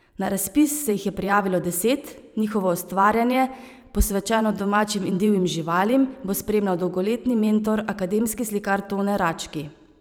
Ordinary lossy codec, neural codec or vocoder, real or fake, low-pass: none; vocoder, 44.1 kHz, 128 mel bands every 512 samples, BigVGAN v2; fake; none